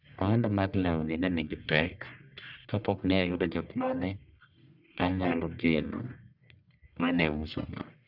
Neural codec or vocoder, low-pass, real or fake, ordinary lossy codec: codec, 44.1 kHz, 1.7 kbps, Pupu-Codec; 5.4 kHz; fake; none